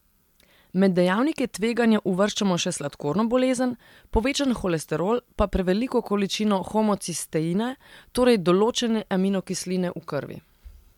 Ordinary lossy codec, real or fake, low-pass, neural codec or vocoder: MP3, 96 kbps; real; 19.8 kHz; none